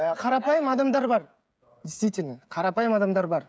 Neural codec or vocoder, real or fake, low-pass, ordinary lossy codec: codec, 16 kHz, 16 kbps, FreqCodec, smaller model; fake; none; none